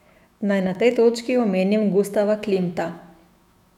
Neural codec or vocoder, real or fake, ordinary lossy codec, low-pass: autoencoder, 48 kHz, 128 numbers a frame, DAC-VAE, trained on Japanese speech; fake; none; 19.8 kHz